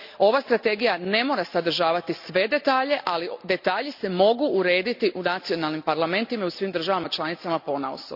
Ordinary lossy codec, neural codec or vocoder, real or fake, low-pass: none; none; real; 5.4 kHz